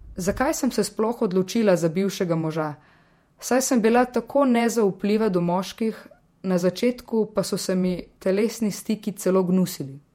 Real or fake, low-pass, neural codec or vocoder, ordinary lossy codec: fake; 19.8 kHz; vocoder, 48 kHz, 128 mel bands, Vocos; MP3, 64 kbps